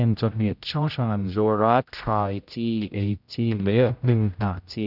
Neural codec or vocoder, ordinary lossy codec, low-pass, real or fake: codec, 16 kHz, 0.5 kbps, X-Codec, HuBERT features, trained on general audio; none; 5.4 kHz; fake